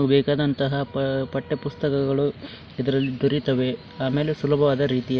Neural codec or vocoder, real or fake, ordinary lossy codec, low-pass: none; real; none; none